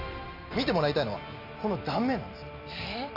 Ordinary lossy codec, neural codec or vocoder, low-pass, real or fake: none; none; 5.4 kHz; real